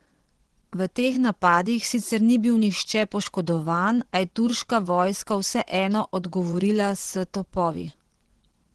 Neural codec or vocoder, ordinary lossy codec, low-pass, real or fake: vocoder, 24 kHz, 100 mel bands, Vocos; Opus, 16 kbps; 10.8 kHz; fake